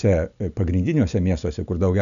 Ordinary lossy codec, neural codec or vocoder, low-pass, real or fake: MP3, 96 kbps; none; 7.2 kHz; real